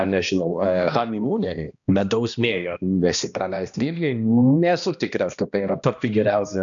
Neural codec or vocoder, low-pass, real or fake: codec, 16 kHz, 1 kbps, X-Codec, HuBERT features, trained on balanced general audio; 7.2 kHz; fake